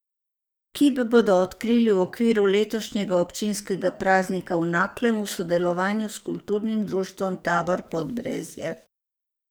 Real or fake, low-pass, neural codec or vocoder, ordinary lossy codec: fake; none; codec, 44.1 kHz, 2.6 kbps, SNAC; none